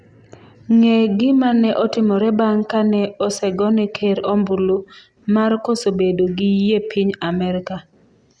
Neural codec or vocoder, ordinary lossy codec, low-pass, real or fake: none; Opus, 64 kbps; 9.9 kHz; real